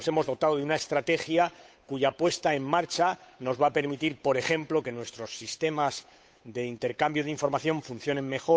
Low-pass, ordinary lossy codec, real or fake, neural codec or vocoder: none; none; fake; codec, 16 kHz, 8 kbps, FunCodec, trained on Chinese and English, 25 frames a second